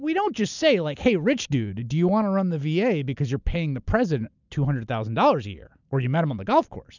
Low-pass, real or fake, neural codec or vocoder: 7.2 kHz; real; none